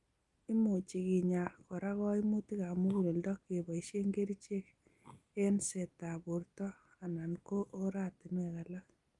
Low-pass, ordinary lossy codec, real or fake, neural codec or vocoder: 10.8 kHz; Opus, 32 kbps; real; none